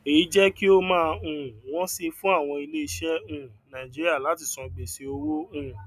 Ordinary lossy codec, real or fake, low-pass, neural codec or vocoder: none; real; 14.4 kHz; none